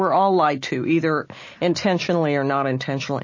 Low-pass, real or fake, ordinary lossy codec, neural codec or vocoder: 7.2 kHz; real; MP3, 32 kbps; none